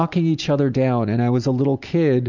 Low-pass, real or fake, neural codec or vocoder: 7.2 kHz; real; none